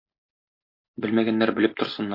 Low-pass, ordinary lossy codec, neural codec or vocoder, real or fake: 5.4 kHz; MP3, 32 kbps; none; real